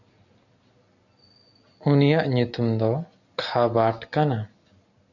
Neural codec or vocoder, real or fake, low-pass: none; real; 7.2 kHz